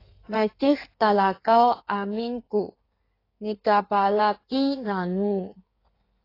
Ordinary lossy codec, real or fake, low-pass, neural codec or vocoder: AAC, 24 kbps; fake; 5.4 kHz; codec, 16 kHz in and 24 kHz out, 1.1 kbps, FireRedTTS-2 codec